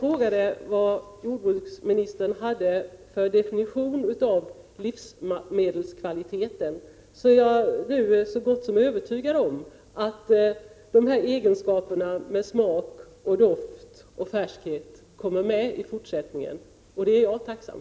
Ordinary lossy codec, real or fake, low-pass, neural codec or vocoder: none; real; none; none